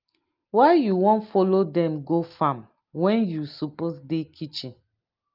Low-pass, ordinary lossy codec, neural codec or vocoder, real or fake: 5.4 kHz; Opus, 24 kbps; none; real